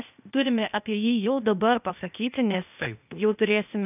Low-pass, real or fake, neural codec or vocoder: 3.6 kHz; fake; codec, 16 kHz, 0.8 kbps, ZipCodec